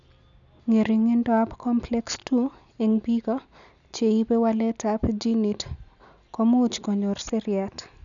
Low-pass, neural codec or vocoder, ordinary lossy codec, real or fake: 7.2 kHz; none; none; real